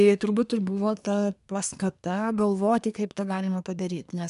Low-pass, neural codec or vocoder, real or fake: 10.8 kHz; codec, 24 kHz, 1 kbps, SNAC; fake